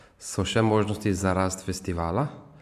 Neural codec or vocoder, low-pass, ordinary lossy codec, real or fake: none; 14.4 kHz; MP3, 96 kbps; real